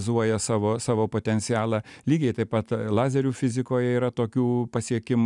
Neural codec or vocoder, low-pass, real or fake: none; 10.8 kHz; real